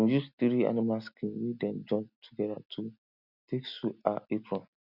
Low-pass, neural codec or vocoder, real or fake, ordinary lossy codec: 5.4 kHz; none; real; none